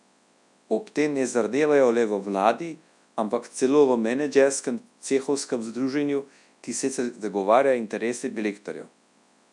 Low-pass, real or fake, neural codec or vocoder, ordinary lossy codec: 10.8 kHz; fake; codec, 24 kHz, 0.9 kbps, WavTokenizer, large speech release; none